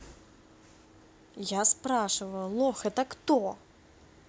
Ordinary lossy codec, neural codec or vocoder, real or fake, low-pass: none; none; real; none